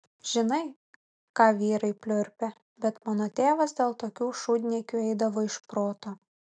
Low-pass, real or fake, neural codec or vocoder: 9.9 kHz; real; none